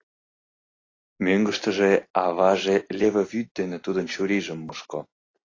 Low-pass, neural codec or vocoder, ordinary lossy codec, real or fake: 7.2 kHz; none; AAC, 32 kbps; real